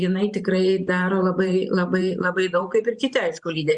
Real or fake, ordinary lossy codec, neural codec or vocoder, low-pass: fake; Opus, 64 kbps; vocoder, 44.1 kHz, 128 mel bands every 512 samples, BigVGAN v2; 10.8 kHz